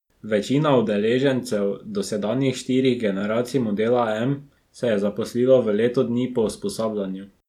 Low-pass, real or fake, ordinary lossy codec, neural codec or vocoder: 19.8 kHz; real; none; none